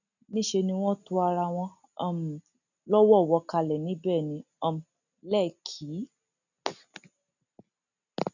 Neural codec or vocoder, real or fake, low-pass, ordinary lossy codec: none; real; 7.2 kHz; none